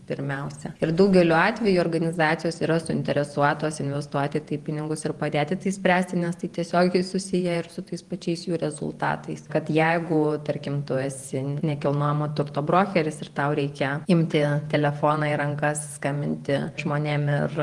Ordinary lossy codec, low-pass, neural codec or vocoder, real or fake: Opus, 24 kbps; 10.8 kHz; vocoder, 48 kHz, 128 mel bands, Vocos; fake